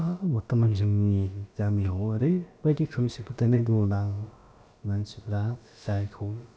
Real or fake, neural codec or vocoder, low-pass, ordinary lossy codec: fake; codec, 16 kHz, about 1 kbps, DyCAST, with the encoder's durations; none; none